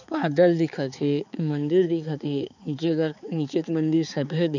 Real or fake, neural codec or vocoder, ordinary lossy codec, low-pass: fake; codec, 16 kHz, 4 kbps, X-Codec, HuBERT features, trained on balanced general audio; none; 7.2 kHz